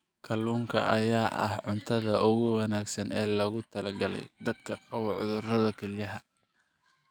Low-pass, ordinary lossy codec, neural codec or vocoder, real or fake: none; none; codec, 44.1 kHz, 7.8 kbps, DAC; fake